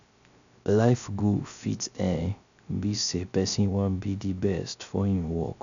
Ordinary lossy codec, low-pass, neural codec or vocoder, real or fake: none; 7.2 kHz; codec, 16 kHz, 0.3 kbps, FocalCodec; fake